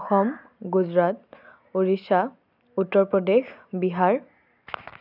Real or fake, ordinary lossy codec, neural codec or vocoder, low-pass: real; none; none; 5.4 kHz